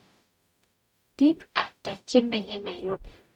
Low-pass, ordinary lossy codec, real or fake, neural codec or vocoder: 19.8 kHz; none; fake; codec, 44.1 kHz, 0.9 kbps, DAC